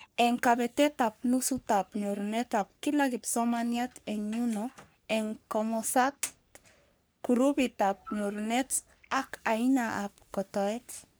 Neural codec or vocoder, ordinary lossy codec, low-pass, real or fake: codec, 44.1 kHz, 3.4 kbps, Pupu-Codec; none; none; fake